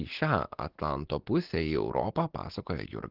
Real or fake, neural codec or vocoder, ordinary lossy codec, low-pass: real; none; Opus, 16 kbps; 5.4 kHz